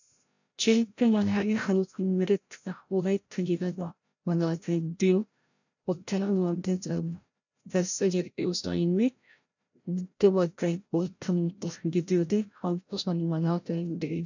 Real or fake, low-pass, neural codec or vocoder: fake; 7.2 kHz; codec, 16 kHz, 0.5 kbps, FreqCodec, larger model